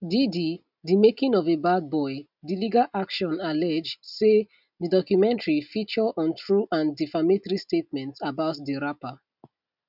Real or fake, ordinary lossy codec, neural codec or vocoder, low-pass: real; none; none; 5.4 kHz